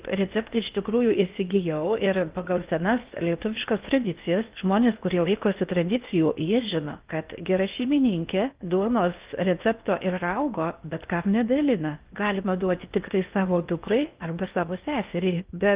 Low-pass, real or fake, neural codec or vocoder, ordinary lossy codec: 3.6 kHz; fake; codec, 16 kHz in and 24 kHz out, 0.8 kbps, FocalCodec, streaming, 65536 codes; Opus, 32 kbps